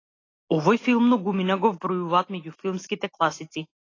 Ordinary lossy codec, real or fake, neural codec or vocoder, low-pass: AAC, 32 kbps; real; none; 7.2 kHz